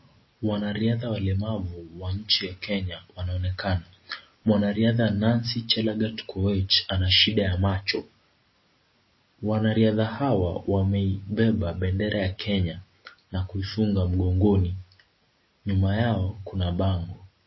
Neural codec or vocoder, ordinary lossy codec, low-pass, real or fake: none; MP3, 24 kbps; 7.2 kHz; real